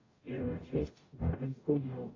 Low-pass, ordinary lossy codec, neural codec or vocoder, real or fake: 7.2 kHz; AAC, 32 kbps; codec, 44.1 kHz, 0.9 kbps, DAC; fake